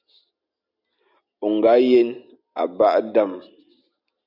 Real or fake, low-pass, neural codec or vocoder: real; 5.4 kHz; none